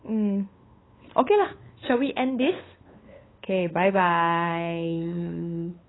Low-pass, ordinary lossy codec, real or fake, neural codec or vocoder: 7.2 kHz; AAC, 16 kbps; fake; codec, 16 kHz, 8 kbps, FunCodec, trained on LibriTTS, 25 frames a second